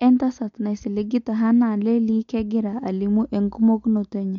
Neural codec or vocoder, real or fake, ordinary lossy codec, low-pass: none; real; MP3, 48 kbps; 7.2 kHz